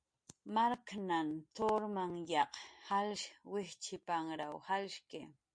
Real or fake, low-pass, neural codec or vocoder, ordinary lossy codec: real; 9.9 kHz; none; MP3, 48 kbps